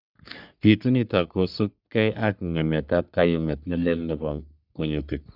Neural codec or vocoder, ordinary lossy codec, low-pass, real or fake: codec, 44.1 kHz, 3.4 kbps, Pupu-Codec; none; 5.4 kHz; fake